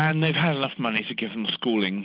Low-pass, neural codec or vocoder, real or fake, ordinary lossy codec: 5.4 kHz; none; real; Opus, 32 kbps